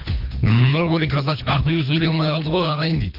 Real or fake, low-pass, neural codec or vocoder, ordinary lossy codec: fake; 5.4 kHz; codec, 24 kHz, 3 kbps, HILCodec; none